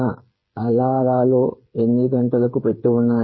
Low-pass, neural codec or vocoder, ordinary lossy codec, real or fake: 7.2 kHz; codec, 16 kHz, 8 kbps, FreqCodec, smaller model; MP3, 24 kbps; fake